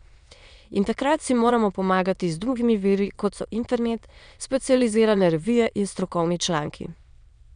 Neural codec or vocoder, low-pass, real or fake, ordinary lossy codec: autoencoder, 22.05 kHz, a latent of 192 numbers a frame, VITS, trained on many speakers; 9.9 kHz; fake; none